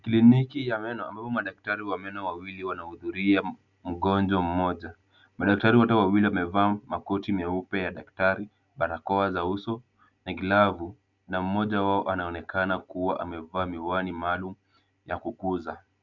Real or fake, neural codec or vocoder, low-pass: real; none; 7.2 kHz